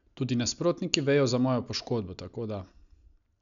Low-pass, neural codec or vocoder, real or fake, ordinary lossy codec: 7.2 kHz; none; real; none